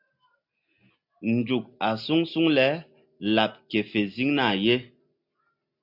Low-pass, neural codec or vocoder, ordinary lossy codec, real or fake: 5.4 kHz; none; MP3, 48 kbps; real